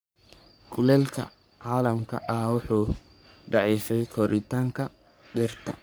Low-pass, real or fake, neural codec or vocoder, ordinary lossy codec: none; fake; codec, 44.1 kHz, 3.4 kbps, Pupu-Codec; none